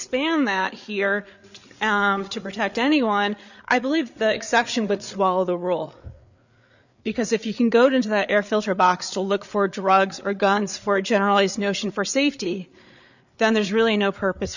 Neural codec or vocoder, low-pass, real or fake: codec, 16 kHz, 8 kbps, FreqCodec, larger model; 7.2 kHz; fake